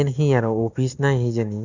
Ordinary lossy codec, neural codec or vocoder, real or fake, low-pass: none; none; real; 7.2 kHz